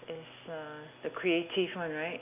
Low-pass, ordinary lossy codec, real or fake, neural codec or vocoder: 3.6 kHz; none; real; none